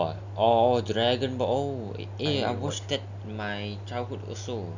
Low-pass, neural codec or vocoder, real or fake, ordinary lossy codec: 7.2 kHz; none; real; none